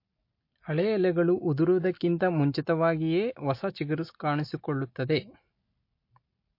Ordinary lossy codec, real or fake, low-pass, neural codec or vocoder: MP3, 32 kbps; real; 5.4 kHz; none